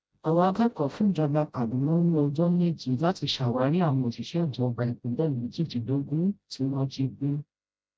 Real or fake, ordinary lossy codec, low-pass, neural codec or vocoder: fake; none; none; codec, 16 kHz, 0.5 kbps, FreqCodec, smaller model